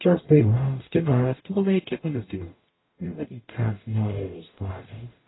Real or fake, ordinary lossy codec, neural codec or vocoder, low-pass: fake; AAC, 16 kbps; codec, 44.1 kHz, 0.9 kbps, DAC; 7.2 kHz